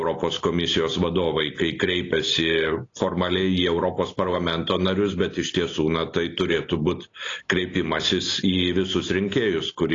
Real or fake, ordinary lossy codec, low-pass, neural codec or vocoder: real; AAC, 32 kbps; 7.2 kHz; none